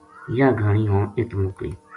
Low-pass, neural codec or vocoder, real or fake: 10.8 kHz; none; real